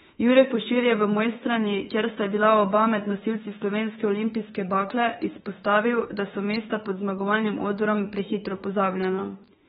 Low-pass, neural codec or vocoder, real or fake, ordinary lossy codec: 19.8 kHz; autoencoder, 48 kHz, 32 numbers a frame, DAC-VAE, trained on Japanese speech; fake; AAC, 16 kbps